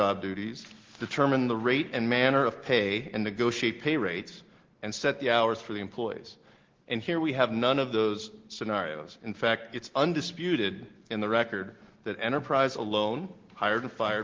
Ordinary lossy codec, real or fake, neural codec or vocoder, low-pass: Opus, 16 kbps; real; none; 7.2 kHz